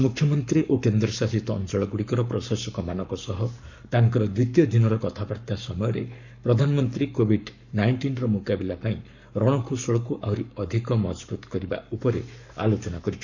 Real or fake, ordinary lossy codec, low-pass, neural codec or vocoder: fake; none; 7.2 kHz; codec, 44.1 kHz, 7.8 kbps, Pupu-Codec